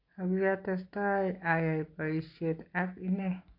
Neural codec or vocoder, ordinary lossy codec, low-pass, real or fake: none; AAC, 32 kbps; 5.4 kHz; real